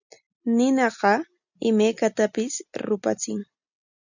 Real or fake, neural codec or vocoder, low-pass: real; none; 7.2 kHz